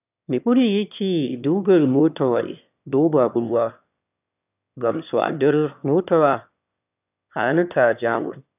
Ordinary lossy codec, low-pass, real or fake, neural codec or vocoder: none; 3.6 kHz; fake; autoencoder, 22.05 kHz, a latent of 192 numbers a frame, VITS, trained on one speaker